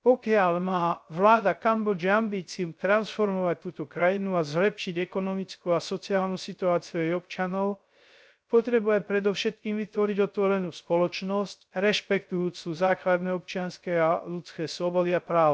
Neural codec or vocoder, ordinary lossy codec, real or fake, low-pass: codec, 16 kHz, 0.3 kbps, FocalCodec; none; fake; none